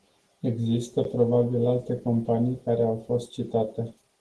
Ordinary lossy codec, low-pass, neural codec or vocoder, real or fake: Opus, 16 kbps; 10.8 kHz; none; real